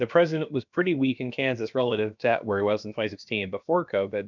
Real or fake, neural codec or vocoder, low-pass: fake; codec, 16 kHz, about 1 kbps, DyCAST, with the encoder's durations; 7.2 kHz